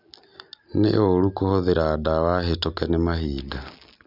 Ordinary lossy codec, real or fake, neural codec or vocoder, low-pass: none; real; none; 5.4 kHz